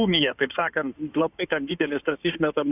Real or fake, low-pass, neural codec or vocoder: fake; 3.6 kHz; codec, 16 kHz in and 24 kHz out, 2.2 kbps, FireRedTTS-2 codec